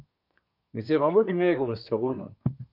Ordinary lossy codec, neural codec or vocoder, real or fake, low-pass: MP3, 48 kbps; codec, 24 kHz, 1 kbps, SNAC; fake; 5.4 kHz